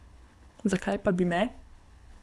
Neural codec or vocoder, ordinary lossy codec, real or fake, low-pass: codec, 24 kHz, 6 kbps, HILCodec; none; fake; none